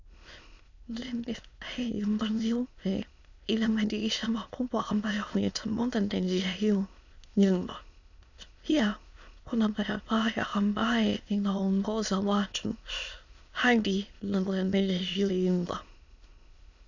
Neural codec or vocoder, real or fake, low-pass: autoencoder, 22.05 kHz, a latent of 192 numbers a frame, VITS, trained on many speakers; fake; 7.2 kHz